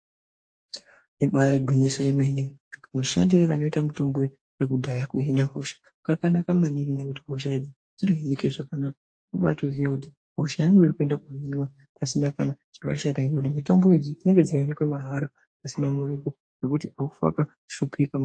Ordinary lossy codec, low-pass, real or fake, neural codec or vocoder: AAC, 48 kbps; 9.9 kHz; fake; codec, 44.1 kHz, 2.6 kbps, DAC